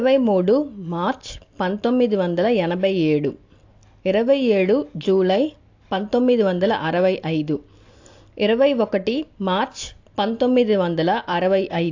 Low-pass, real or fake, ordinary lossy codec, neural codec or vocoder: 7.2 kHz; real; AAC, 48 kbps; none